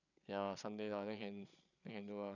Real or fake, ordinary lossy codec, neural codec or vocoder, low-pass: fake; none; codec, 16 kHz, 8 kbps, FreqCodec, larger model; 7.2 kHz